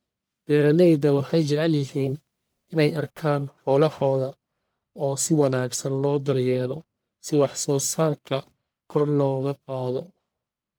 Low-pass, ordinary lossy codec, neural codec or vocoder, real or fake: none; none; codec, 44.1 kHz, 1.7 kbps, Pupu-Codec; fake